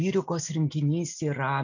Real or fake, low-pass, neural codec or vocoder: real; 7.2 kHz; none